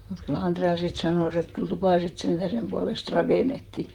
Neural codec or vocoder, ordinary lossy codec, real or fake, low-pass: vocoder, 44.1 kHz, 128 mel bands, Pupu-Vocoder; Opus, 32 kbps; fake; 19.8 kHz